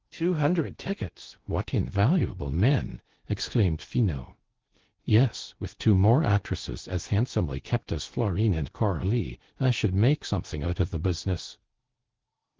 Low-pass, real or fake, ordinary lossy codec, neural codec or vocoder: 7.2 kHz; fake; Opus, 16 kbps; codec, 16 kHz in and 24 kHz out, 0.6 kbps, FocalCodec, streaming, 2048 codes